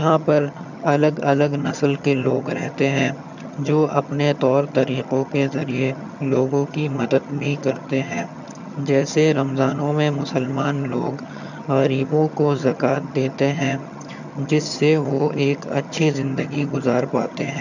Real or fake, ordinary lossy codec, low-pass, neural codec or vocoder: fake; none; 7.2 kHz; vocoder, 22.05 kHz, 80 mel bands, HiFi-GAN